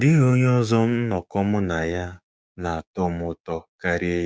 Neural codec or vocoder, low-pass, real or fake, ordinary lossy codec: codec, 16 kHz, 6 kbps, DAC; none; fake; none